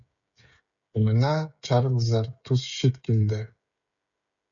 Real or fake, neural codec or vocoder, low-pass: fake; codec, 16 kHz, 16 kbps, FreqCodec, smaller model; 7.2 kHz